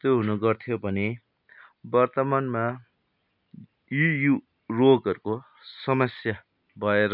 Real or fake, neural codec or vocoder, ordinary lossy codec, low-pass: real; none; none; 5.4 kHz